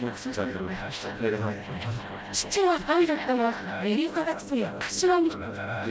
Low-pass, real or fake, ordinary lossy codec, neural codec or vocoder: none; fake; none; codec, 16 kHz, 0.5 kbps, FreqCodec, smaller model